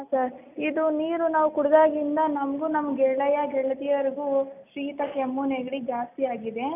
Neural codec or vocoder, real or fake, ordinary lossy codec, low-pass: none; real; none; 3.6 kHz